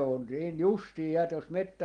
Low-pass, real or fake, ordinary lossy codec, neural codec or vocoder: 9.9 kHz; real; Opus, 24 kbps; none